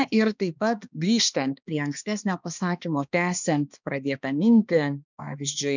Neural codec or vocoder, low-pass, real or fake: codec, 16 kHz, 2 kbps, X-Codec, HuBERT features, trained on balanced general audio; 7.2 kHz; fake